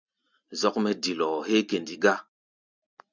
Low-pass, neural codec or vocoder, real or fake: 7.2 kHz; none; real